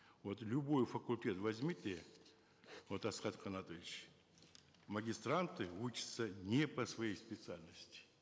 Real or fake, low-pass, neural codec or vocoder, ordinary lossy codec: real; none; none; none